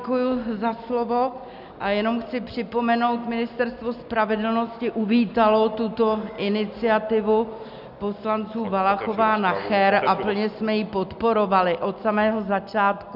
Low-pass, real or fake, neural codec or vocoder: 5.4 kHz; real; none